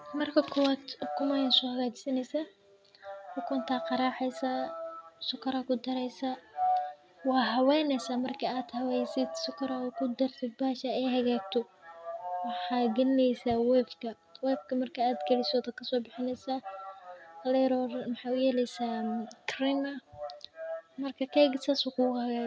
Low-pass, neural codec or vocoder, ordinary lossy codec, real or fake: none; none; none; real